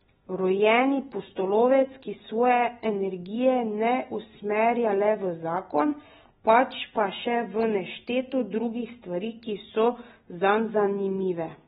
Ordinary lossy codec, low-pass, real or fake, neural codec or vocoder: AAC, 16 kbps; 19.8 kHz; real; none